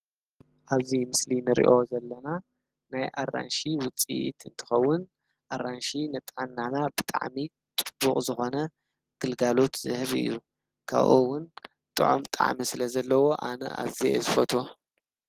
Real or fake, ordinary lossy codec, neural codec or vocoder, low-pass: real; Opus, 16 kbps; none; 14.4 kHz